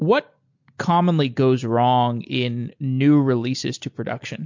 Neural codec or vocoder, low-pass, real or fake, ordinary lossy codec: none; 7.2 kHz; real; MP3, 48 kbps